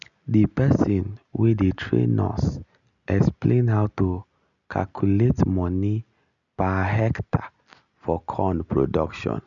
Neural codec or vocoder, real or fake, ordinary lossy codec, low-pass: none; real; none; 7.2 kHz